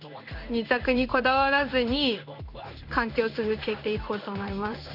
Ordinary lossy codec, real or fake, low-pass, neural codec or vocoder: none; fake; 5.4 kHz; codec, 16 kHz in and 24 kHz out, 1 kbps, XY-Tokenizer